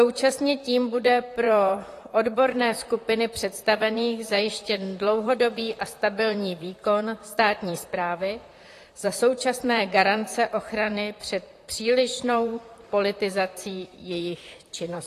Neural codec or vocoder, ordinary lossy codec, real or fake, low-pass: vocoder, 44.1 kHz, 128 mel bands, Pupu-Vocoder; AAC, 48 kbps; fake; 14.4 kHz